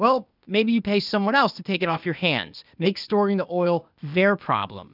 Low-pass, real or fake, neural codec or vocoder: 5.4 kHz; fake; codec, 16 kHz, 0.8 kbps, ZipCodec